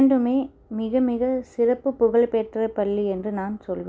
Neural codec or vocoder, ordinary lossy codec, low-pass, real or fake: none; none; none; real